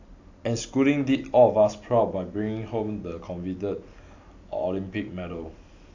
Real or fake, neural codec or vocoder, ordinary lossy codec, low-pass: real; none; AAC, 48 kbps; 7.2 kHz